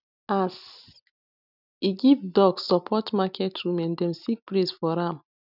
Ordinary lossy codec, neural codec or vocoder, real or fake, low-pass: none; none; real; 5.4 kHz